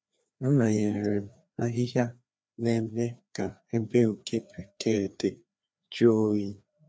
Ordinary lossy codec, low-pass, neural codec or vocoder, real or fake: none; none; codec, 16 kHz, 2 kbps, FreqCodec, larger model; fake